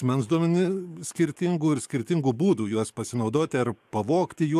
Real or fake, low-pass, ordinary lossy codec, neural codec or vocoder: fake; 14.4 kHz; AAC, 96 kbps; codec, 44.1 kHz, 7.8 kbps, DAC